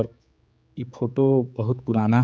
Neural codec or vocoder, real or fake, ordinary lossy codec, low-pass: codec, 16 kHz, 4 kbps, X-Codec, HuBERT features, trained on general audio; fake; none; none